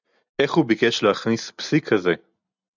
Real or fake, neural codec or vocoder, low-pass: real; none; 7.2 kHz